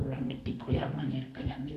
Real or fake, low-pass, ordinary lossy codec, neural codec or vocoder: fake; 14.4 kHz; AAC, 64 kbps; codec, 32 kHz, 1.9 kbps, SNAC